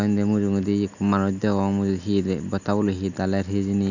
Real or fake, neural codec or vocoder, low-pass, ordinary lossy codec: real; none; 7.2 kHz; none